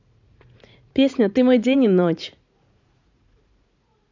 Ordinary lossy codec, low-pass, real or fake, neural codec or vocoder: MP3, 64 kbps; 7.2 kHz; fake; vocoder, 44.1 kHz, 80 mel bands, Vocos